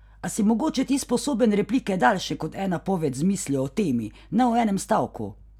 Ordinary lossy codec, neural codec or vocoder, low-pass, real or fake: none; none; 19.8 kHz; real